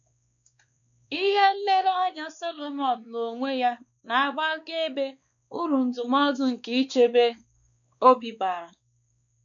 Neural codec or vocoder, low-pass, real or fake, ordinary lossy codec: codec, 16 kHz, 2 kbps, X-Codec, WavLM features, trained on Multilingual LibriSpeech; 7.2 kHz; fake; none